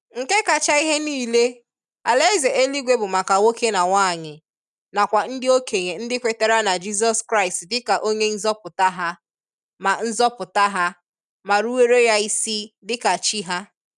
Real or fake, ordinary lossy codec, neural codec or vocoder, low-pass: real; none; none; 10.8 kHz